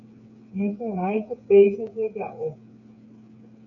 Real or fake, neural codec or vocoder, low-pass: fake; codec, 16 kHz, 8 kbps, FreqCodec, smaller model; 7.2 kHz